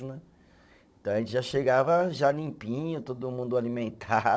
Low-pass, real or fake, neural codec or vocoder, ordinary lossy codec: none; fake; codec, 16 kHz, 16 kbps, FunCodec, trained on Chinese and English, 50 frames a second; none